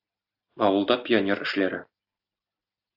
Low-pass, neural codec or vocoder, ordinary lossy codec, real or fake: 5.4 kHz; none; AAC, 48 kbps; real